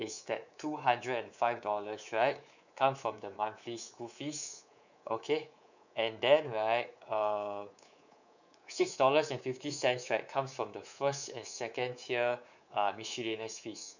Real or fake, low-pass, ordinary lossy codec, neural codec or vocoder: fake; 7.2 kHz; none; codec, 24 kHz, 3.1 kbps, DualCodec